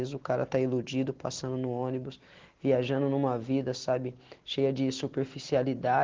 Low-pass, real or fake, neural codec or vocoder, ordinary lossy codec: 7.2 kHz; real; none; Opus, 16 kbps